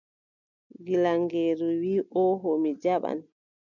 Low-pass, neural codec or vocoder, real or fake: 7.2 kHz; none; real